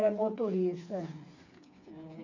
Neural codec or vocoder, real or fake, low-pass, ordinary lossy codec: codec, 16 kHz, 4 kbps, FreqCodec, smaller model; fake; 7.2 kHz; none